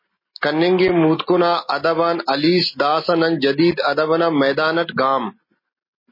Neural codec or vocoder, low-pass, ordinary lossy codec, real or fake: none; 5.4 kHz; MP3, 24 kbps; real